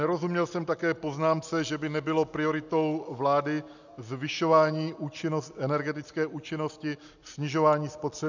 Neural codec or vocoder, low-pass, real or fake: none; 7.2 kHz; real